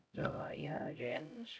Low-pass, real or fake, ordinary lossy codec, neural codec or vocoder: none; fake; none; codec, 16 kHz, 0.5 kbps, X-Codec, HuBERT features, trained on LibriSpeech